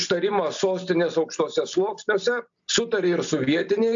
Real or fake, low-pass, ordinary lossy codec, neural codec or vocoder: real; 7.2 kHz; AAC, 64 kbps; none